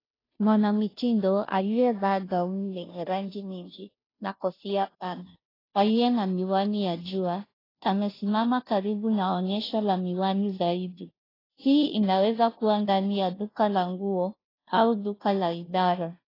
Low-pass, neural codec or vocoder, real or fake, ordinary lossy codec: 5.4 kHz; codec, 16 kHz, 0.5 kbps, FunCodec, trained on Chinese and English, 25 frames a second; fake; AAC, 24 kbps